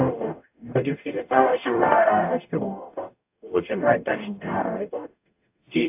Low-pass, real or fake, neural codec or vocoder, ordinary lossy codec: 3.6 kHz; fake; codec, 44.1 kHz, 0.9 kbps, DAC; AAC, 32 kbps